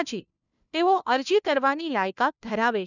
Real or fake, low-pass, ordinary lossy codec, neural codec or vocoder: fake; 7.2 kHz; none; codec, 16 kHz, 1 kbps, FunCodec, trained on LibriTTS, 50 frames a second